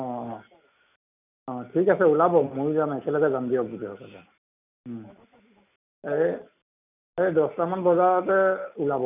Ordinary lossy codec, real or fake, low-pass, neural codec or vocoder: none; real; 3.6 kHz; none